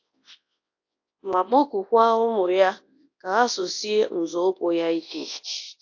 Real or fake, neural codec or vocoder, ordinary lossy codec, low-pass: fake; codec, 24 kHz, 0.9 kbps, WavTokenizer, large speech release; AAC, 48 kbps; 7.2 kHz